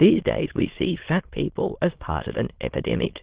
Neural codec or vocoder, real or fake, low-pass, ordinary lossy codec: autoencoder, 22.05 kHz, a latent of 192 numbers a frame, VITS, trained on many speakers; fake; 3.6 kHz; Opus, 16 kbps